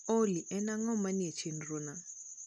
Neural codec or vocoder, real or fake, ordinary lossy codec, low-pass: none; real; none; none